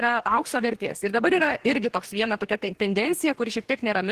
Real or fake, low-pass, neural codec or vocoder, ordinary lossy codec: fake; 14.4 kHz; codec, 44.1 kHz, 2.6 kbps, DAC; Opus, 16 kbps